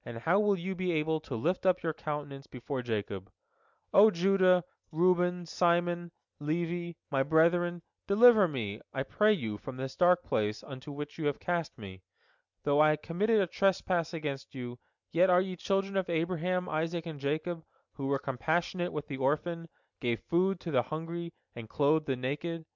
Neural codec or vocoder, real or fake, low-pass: none; real; 7.2 kHz